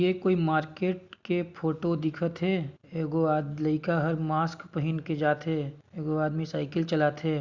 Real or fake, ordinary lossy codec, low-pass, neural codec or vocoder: real; Opus, 64 kbps; 7.2 kHz; none